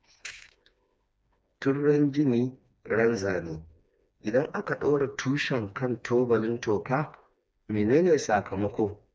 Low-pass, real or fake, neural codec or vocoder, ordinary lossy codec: none; fake; codec, 16 kHz, 2 kbps, FreqCodec, smaller model; none